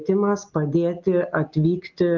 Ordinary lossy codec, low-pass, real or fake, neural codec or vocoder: Opus, 24 kbps; 7.2 kHz; real; none